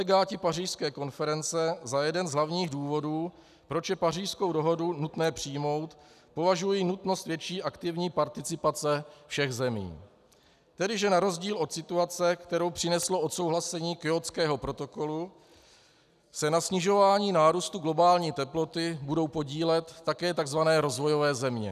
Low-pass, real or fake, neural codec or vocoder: 14.4 kHz; real; none